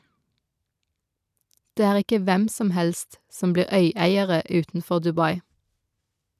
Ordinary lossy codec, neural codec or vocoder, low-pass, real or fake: none; none; 14.4 kHz; real